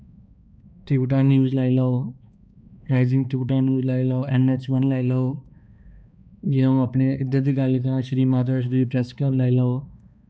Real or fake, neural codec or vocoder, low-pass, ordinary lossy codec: fake; codec, 16 kHz, 2 kbps, X-Codec, HuBERT features, trained on balanced general audio; none; none